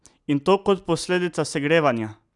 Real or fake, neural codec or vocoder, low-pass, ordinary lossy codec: real; none; 10.8 kHz; none